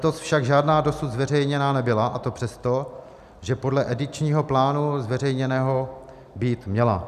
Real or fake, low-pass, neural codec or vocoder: real; 14.4 kHz; none